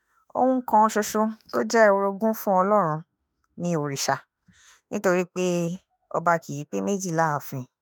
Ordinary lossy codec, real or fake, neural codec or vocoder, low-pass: none; fake; autoencoder, 48 kHz, 32 numbers a frame, DAC-VAE, trained on Japanese speech; none